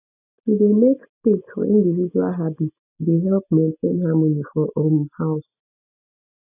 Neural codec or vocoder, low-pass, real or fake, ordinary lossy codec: none; 3.6 kHz; real; none